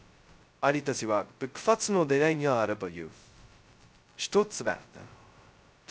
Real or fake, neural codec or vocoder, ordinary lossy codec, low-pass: fake; codec, 16 kHz, 0.2 kbps, FocalCodec; none; none